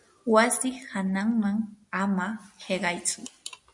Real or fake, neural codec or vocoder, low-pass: real; none; 10.8 kHz